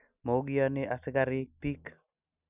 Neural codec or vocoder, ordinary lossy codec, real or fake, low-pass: none; none; real; 3.6 kHz